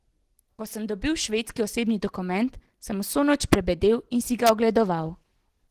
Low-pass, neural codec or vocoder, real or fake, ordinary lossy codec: 14.4 kHz; none; real; Opus, 16 kbps